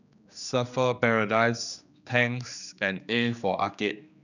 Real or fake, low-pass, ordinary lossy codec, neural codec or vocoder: fake; 7.2 kHz; none; codec, 16 kHz, 2 kbps, X-Codec, HuBERT features, trained on general audio